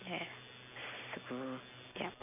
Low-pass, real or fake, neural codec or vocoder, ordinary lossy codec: 3.6 kHz; real; none; AAC, 32 kbps